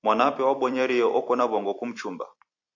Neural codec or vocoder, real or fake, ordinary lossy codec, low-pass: vocoder, 44.1 kHz, 128 mel bands every 256 samples, BigVGAN v2; fake; AAC, 48 kbps; 7.2 kHz